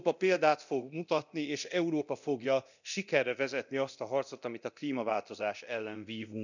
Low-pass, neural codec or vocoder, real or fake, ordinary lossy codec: 7.2 kHz; codec, 24 kHz, 0.9 kbps, DualCodec; fake; none